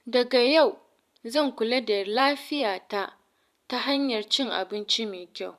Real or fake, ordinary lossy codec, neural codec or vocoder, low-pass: fake; none; vocoder, 44.1 kHz, 128 mel bands every 512 samples, BigVGAN v2; 14.4 kHz